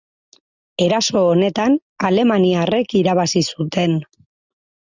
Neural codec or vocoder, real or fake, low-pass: none; real; 7.2 kHz